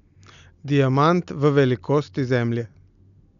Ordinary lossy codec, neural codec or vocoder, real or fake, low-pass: none; none; real; 7.2 kHz